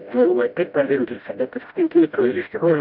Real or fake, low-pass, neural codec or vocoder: fake; 5.4 kHz; codec, 16 kHz, 0.5 kbps, FreqCodec, smaller model